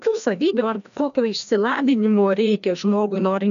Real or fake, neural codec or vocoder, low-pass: fake; codec, 16 kHz, 1 kbps, FreqCodec, larger model; 7.2 kHz